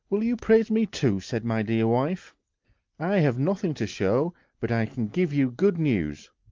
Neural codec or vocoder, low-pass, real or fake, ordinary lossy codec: none; 7.2 kHz; real; Opus, 24 kbps